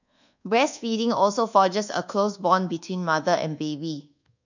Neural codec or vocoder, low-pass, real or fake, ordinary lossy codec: codec, 24 kHz, 1.2 kbps, DualCodec; 7.2 kHz; fake; none